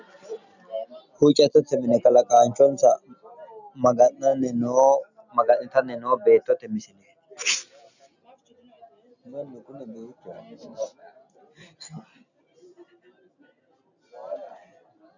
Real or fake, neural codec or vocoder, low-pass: real; none; 7.2 kHz